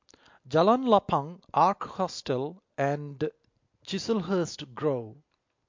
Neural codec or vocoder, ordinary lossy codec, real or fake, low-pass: none; MP3, 48 kbps; real; 7.2 kHz